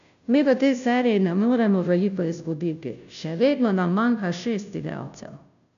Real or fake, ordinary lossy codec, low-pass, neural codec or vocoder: fake; none; 7.2 kHz; codec, 16 kHz, 0.5 kbps, FunCodec, trained on Chinese and English, 25 frames a second